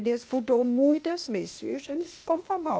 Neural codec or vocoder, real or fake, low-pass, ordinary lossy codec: codec, 16 kHz, 0.8 kbps, ZipCodec; fake; none; none